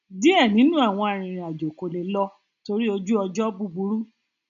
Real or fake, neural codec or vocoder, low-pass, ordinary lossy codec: real; none; 7.2 kHz; AAC, 96 kbps